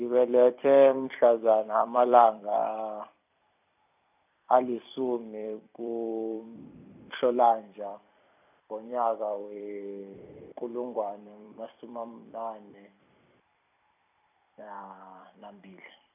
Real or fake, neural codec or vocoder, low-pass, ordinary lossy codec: fake; vocoder, 44.1 kHz, 128 mel bands every 256 samples, BigVGAN v2; 3.6 kHz; none